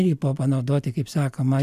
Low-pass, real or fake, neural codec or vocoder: 14.4 kHz; real; none